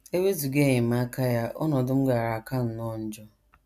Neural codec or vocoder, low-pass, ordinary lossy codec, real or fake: none; 14.4 kHz; none; real